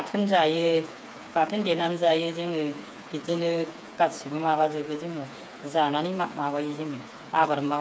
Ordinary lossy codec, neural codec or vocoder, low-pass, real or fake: none; codec, 16 kHz, 4 kbps, FreqCodec, smaller model; none; fake